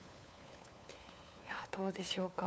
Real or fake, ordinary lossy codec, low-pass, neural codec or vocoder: fake; none; none; codec, 16 kHz, 4 kbps, FunCodec, trained on LibriTTS, 50 frames a second